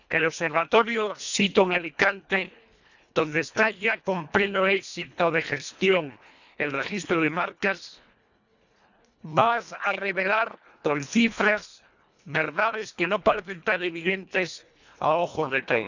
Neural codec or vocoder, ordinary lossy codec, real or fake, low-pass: codec, 24 kHz, 1.5 kbps, HILCodec; none; fake; 7.2 kHz